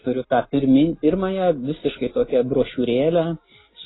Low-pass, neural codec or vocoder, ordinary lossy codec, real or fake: 7.2 kHz; none; AAC, 16 kbps; real